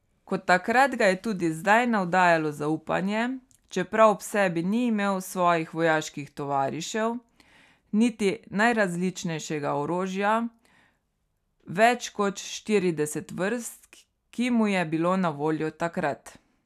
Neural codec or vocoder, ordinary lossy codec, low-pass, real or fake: none; none; 14.4 kHz; real